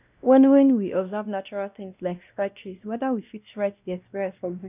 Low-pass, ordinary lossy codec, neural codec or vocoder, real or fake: 3.6 kHz; none; codec, 16 kHz, 1 kbps, X-Codec, WavLM features, trained on Multilingual LibriSpeech; fake